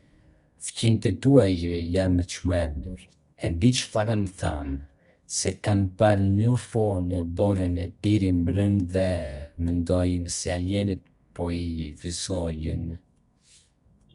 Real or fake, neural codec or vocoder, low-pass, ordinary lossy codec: fake; codec, 24 kHz, 0.9 kbps, WavTokenizer, medium music audio release; 10.8 kHz; Opus, 64 kbps